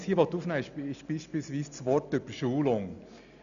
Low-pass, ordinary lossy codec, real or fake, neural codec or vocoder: 7.2 kHz; none; real; none